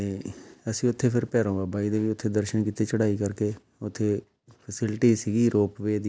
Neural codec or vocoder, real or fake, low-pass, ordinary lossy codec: none; real; none; none